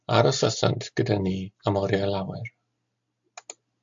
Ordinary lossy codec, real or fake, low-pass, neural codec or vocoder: AAC, 64 kbps; real; 7.2 kHz; none